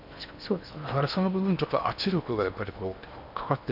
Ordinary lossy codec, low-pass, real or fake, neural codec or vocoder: none; 5.4 kHz; fake; codec, 16 kHz in and 24 kHz out, 0.8 kbps, FocalCodec, streaming, 65536 codes